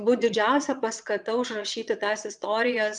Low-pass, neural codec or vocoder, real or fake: 9.9 kHz; vocoder, 22.05 kHz, 80 mel bands, Vocos; fake